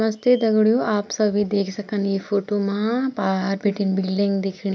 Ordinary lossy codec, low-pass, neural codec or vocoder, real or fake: none; none; none; real